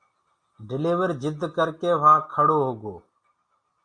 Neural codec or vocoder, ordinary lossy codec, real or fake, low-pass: none; Opus, 64 kbps; real; 9.9 kHz